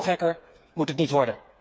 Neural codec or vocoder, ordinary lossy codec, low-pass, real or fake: codec, 16 kHz, 4 kbps, FreqCodec, smaller model; none; none; fake